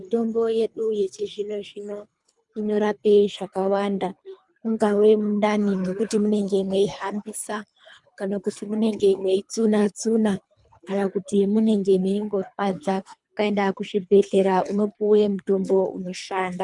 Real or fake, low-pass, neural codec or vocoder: fake; 10.8 kHz; codec, 24 kHz, 3 kbps, HILCodec